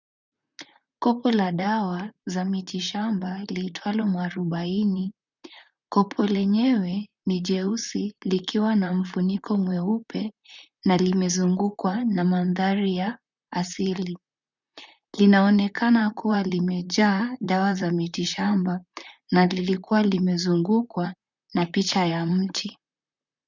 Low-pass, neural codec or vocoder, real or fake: 7.2 kHz; vocoder, 44.1 kHz, 128 mel bands, Pupu-Vocoder; fake